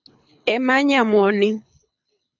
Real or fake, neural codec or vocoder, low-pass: fake; codec, 24 kHz, 6 kbps, HILCodec; 7.2 kHz